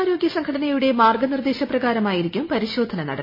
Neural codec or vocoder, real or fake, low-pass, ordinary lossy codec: none; real; 5.4 kHz; MP3, 24 kbps